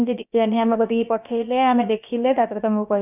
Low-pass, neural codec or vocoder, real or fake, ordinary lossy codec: 3.6 kHz; codec, 16 kHz, about 1 kbps, DyCAST, with the encoder's durations; fake; none